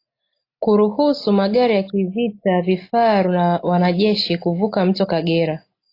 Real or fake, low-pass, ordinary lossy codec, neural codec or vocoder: real; 5.4 kHz; AAC, 32 kbps; none